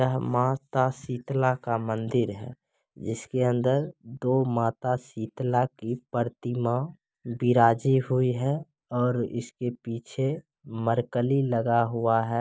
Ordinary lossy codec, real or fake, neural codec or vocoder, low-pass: none; real; none; none